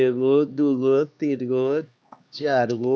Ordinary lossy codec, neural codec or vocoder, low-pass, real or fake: none; codec, 16 kHz, 2 kbps, X-Codec, HuBERT features, trained on LibriSpeech; none; fake